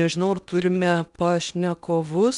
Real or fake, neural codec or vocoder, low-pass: fake; codec, 16 kHz in and 24 kHz out, 0.8 kbps, FocalCodec, streaming, 65536 codes; 10.8 kHz